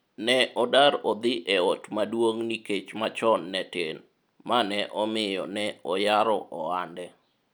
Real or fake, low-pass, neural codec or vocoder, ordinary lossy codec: real; none; none; none